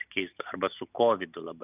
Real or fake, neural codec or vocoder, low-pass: real; none; 3.6 kHz